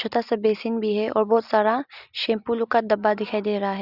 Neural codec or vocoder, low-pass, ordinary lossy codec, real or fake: none; 5.4 kHz; Opus, 64 kbps; real